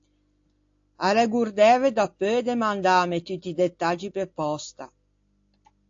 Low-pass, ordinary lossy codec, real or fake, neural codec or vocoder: 7.2 kHz; AAC, 48 kbps; real; none